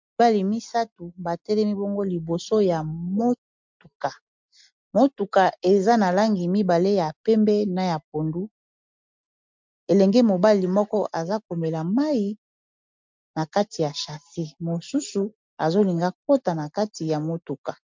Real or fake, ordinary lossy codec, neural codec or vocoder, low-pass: real; MP3, 64 kbps; none; 7.2 kHz